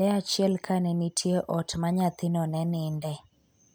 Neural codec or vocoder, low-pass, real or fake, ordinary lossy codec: none; none; real; none